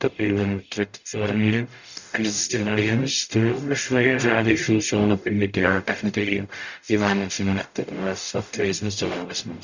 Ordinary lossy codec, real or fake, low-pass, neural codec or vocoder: none; fake; 7.2 kHz; codec, 44.1 kHz, 0.9 kbps, DAC